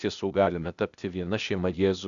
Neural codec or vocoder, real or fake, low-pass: codec, 16 kHz, 0.8 kbps, ZipCodec; fake; 7.2 kHz